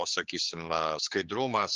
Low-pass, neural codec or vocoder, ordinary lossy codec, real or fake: 7.2 kHz; codec, 16 kHz, 4 kbps, X-Codec, HuBERT features, trained on general audio; Opus, 32 kbps; fake